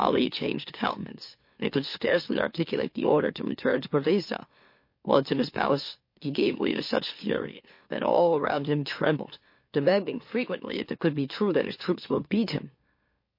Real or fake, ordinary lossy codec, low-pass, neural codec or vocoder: fake; MP3, 32 kbps; 5.4 kHz; autoencoder, 44.1 kHz, a latent of 192 numbers a frame, MeloTTS